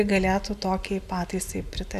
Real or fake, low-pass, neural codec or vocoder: real; 14.4 kHz; none